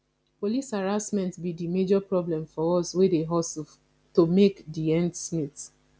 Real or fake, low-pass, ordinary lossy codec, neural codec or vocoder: real; none; none; none